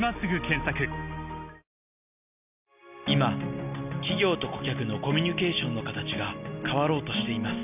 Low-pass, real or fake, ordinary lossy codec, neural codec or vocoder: 3.6 kHz; real; none; none